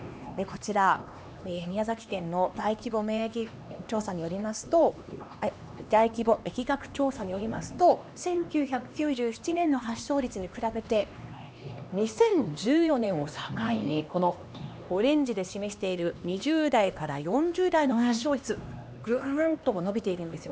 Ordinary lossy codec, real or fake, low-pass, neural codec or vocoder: none; fake; none; codec, 16 kHz, 2 kbps, X-Codec, HuBERT features, trained on LibriSpeech